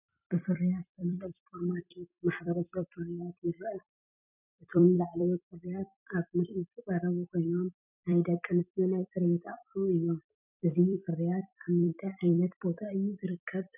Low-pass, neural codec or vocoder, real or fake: 3.6 kHz; vocoder, 44.1 kHz, 128 mel bands every 256 samples, BigVGAN v2; fake